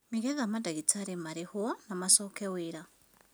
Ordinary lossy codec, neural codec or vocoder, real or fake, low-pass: none; none; real; none